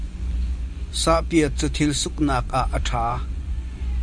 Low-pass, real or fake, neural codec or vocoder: 9.9 kHz; fake; vocoder, 24 kHz, 100 mel bands, Vocos